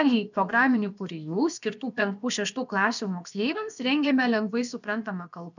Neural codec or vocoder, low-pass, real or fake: codec, 16 kHz, about 1 kbps, DyCAST, with the encoder's durations; 7.2 kHz; fake